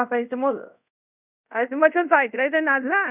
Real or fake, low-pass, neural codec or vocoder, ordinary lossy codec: fake; 3.6 kHz; codec, 24 kHz, 0.5 kbps, DualCodec; none